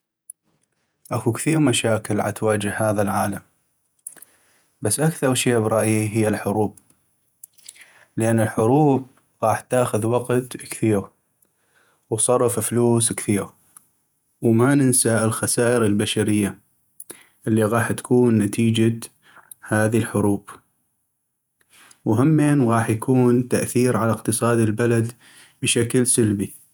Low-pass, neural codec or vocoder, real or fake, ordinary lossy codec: none; vocoder, 48 kHz, 128 mel bands, Vocos; fake; none